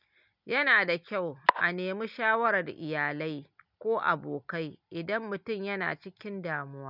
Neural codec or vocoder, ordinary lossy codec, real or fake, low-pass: none; none; real; 5.4 kHz